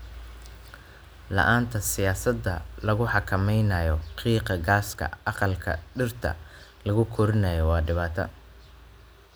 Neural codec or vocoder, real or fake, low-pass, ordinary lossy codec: none; real; none; none